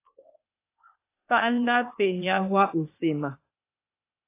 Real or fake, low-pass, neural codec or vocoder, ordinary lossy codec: fake; 3.6 kHz; codec, 16 kHz, 0.8 kbps, ZipCodec; AAC, 24 kbps